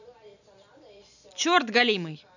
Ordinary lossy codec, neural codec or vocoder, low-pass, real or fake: none; none; 7.2 kHz; real